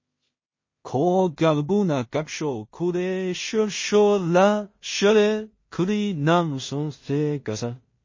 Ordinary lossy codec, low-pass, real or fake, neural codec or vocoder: MP3, 32 kbps; 7.2 kHz; fake; codec, 16 kHz in and 24 kHz out, 0.4 kbps, LongCat-Audio-Codec, two codebook decoder